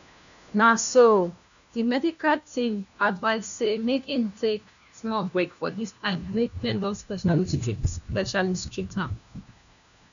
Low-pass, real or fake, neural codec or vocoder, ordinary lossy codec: 7.2 kHz; fake; codec, 16 kHz, 1 kbps, FunCodec, trained on LibriTTS, 50 frames a second; none